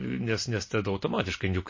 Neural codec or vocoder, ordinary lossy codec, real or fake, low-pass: none; MP3, 32 kbps; real; 7.2 kHz